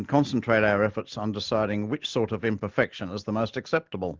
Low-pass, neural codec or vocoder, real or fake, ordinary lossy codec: 7.2 kHz; none; real; Opus, 16 kbps